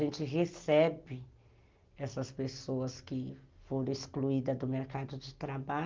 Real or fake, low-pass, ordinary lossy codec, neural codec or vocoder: real; 7.2 kHz; Opus, 32 kbps; none